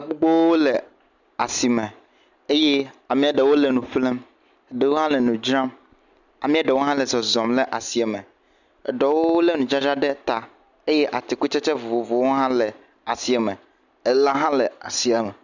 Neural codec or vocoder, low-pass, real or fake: none; 7.2 kHz; real